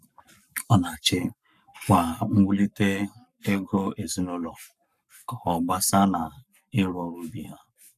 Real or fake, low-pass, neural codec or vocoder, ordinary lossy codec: fake; 14.4 kHz; codec, 44.1 kHz, 7.8 kbps, Pupu-Codec; none